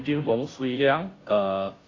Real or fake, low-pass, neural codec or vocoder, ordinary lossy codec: fake; 7.2 kHz; codec, 16 kHz, 0.5 kbps, FunCodec, trained on Chinese and English, 25 frames a second; AAC, 32 kbps